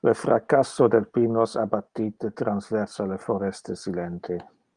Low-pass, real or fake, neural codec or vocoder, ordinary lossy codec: 9.9 kHz; real; none; Opus, 32 kbps